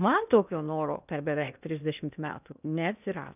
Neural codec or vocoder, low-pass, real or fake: codec, 16 kHz in and 24 kHz out, 0.8 kbps, FocalCodec, streaming, 65536 codes; 3.6 kHz; fake